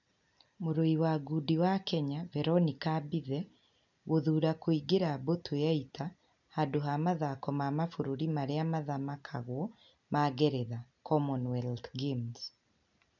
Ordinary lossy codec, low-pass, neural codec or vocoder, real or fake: none; 7.2 kHz; none; real